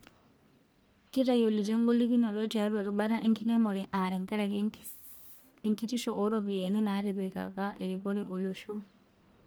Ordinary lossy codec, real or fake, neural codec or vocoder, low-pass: none; fake; codec, 44.1 kHz, 1.7 kbps, Pupu-Codec; none